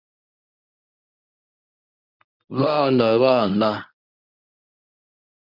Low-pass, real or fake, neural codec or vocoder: 5.4 kHz; fake; codec, 24 kHz, 0.9 kbps, WavTokenizer, medium speech release version 1